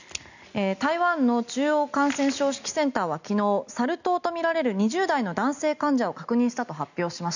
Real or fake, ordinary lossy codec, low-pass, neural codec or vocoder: real; none; 7.2 kHz; none